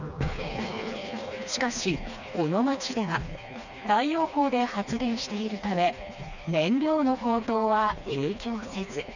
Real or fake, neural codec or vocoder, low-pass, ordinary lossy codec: fake; codec, 16 kHz, 2 kbps, FreqCodec, smaller model; 7.2 kHz; none